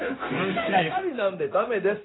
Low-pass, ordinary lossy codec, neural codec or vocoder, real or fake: 7.2 kHz; AAC, 16 kbps; codec, 16 kHz, 0.9 kbps, LongCat-Audio-Codec; fake